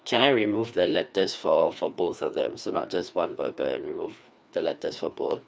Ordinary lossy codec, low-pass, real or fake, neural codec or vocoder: none; none; fake; codec, 16 kHz, 2 kbps, FreqCodec, larger model